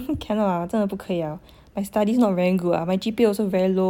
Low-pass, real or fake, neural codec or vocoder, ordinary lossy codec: 19.8 kHz; real; none; MP3, 96 kbps